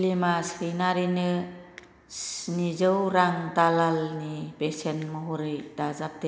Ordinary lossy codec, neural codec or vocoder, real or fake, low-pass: none; none; real; none